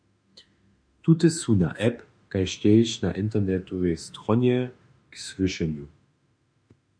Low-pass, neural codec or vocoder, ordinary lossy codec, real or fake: 9.9 kHz; autoencoder, 48 kHz, 32 numbers a frame, DAC-VAE, trained on Japanese speech; MP3, 64 kbps; fake